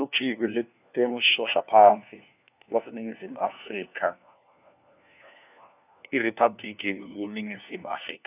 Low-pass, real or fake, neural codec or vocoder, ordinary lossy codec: 3.6 kHz; fake; codec, 16 kHz, 1 kbps, FunCodec, trained on LibriTTS, 50 frames a second; none